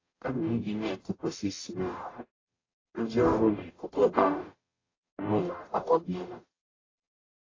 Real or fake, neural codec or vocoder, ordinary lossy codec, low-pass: fake; codec, 44.1 kHz, 0.9 kbps, DAC; AAC, 32 kbps; 7.2 kHz